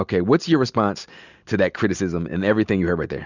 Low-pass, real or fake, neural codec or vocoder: 7.2 kHz; real; none